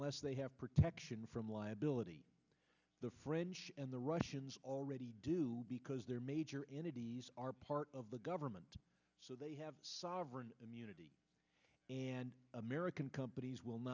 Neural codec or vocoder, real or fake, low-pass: none; real; 7.2 kHz